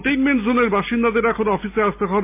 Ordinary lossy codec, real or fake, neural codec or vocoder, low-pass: MP3, 24 kbps; real; none; 3.6 kHz